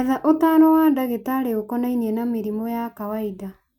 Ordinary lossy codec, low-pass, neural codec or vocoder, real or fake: none; 19.8 kHz; none; real